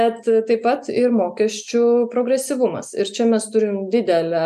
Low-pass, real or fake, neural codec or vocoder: 14.4 kHz; real; none